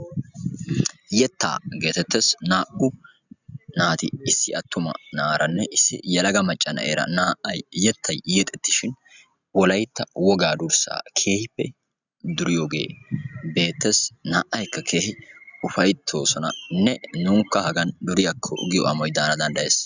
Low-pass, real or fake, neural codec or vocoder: 7.2 kHz; real; none